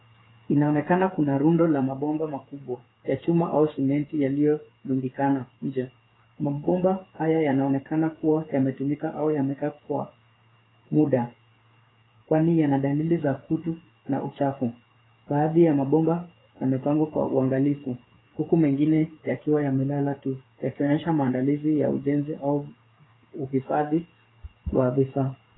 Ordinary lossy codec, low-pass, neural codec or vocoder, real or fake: AAC, 16 kbps; 7.2 kHz; codec, 16 kHz, 8 kbps, FreqCodec, smaller model; fake